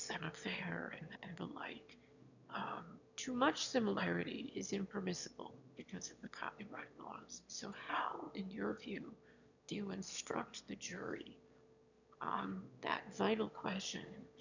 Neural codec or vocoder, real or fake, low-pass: autoencoder, 22.05 kHz, a latent of 192 numbers a frame, VITS, trained on one speaker; fake; 7.2 kHz